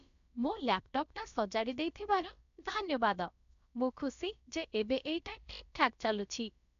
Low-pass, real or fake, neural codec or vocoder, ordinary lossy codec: 7.2 kHz; fake; codec, 16 kHz, about 1 kbps, DyCAST, with the encoder's durations; none